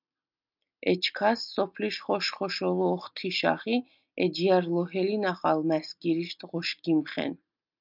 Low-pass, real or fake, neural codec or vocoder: 5.4 kHz; real; none